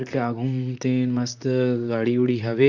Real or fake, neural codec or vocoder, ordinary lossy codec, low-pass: fake; vocoder, 44.1 kHz, 128 mel bands, Pupu-Vocoder; none; 7.2 kHz